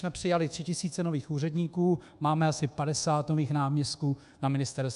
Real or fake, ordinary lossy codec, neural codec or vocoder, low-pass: fake; AAC, 96 kbps; codec, 24 kHz, 1.2 kbps, DualCodec; 10.8 kHz